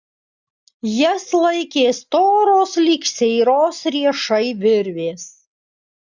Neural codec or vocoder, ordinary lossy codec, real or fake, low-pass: none; Opus, 64 kbps; real; 7.2 kHz